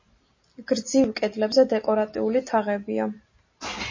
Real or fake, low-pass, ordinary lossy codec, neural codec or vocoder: real; 7.2 kHz; MP3, 32 kbps; none